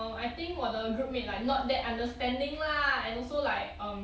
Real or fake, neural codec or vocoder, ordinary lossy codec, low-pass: real; none; none; none